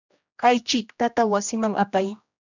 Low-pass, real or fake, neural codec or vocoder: 7.2 kHz; fake; codec, 16 kHz, 1 kbps, X-Codec, HuBERT features, trained on general audio